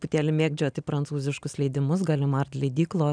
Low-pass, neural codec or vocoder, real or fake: 9.9 kHz; none; real